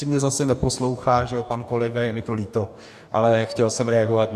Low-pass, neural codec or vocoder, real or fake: 14.4 kHz; codec, 44.1 kHz, 2.6 kbps, DAC; fake